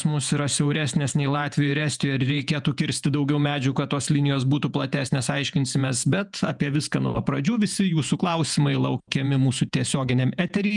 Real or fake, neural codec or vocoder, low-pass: fake; vocoder, 48 kHz, 128 mel bands, Vocos; 10.8 kHz